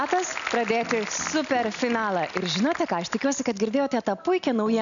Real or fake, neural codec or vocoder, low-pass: real; none; 7.2 kHz